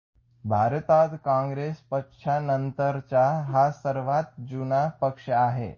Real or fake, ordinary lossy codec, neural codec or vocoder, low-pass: real; MP3, 32 kbps; none; 7.2 kHz